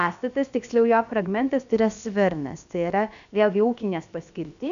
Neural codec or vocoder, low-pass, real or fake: codec, 16 kHz, about 1 kbps, DyCAST, with the encoder's durations; 7.2 kHz; fake